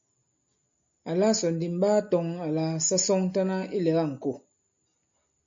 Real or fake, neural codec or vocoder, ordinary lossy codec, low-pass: real; none; MP3, 48 kbps; 7.2 kHz